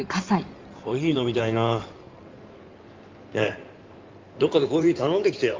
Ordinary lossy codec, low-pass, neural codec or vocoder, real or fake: Opus, 32 kbps; 7.2 kHz; codec, 16 kHz in and 24 kHz out, 2.2 kbps, FireRedTTS-2 codec; fake